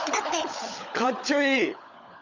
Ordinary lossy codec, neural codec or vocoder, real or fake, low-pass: none; codec, 16 kHz, 16 kbps, FunCodec, trained on LibriTTS, 50 frames a second; fake; 7.2 kHz